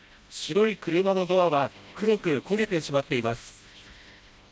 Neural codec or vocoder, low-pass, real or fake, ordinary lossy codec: codec, 16 kHz, 1 kbps, FreqCodec, smaller model; none; fake; none